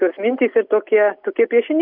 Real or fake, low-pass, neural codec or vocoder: real; 5.4 kHz; none